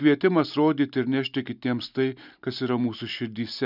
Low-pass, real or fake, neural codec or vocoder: 5.4 kHz; real; none